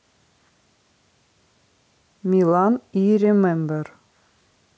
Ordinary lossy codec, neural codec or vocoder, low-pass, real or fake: none; none; none; real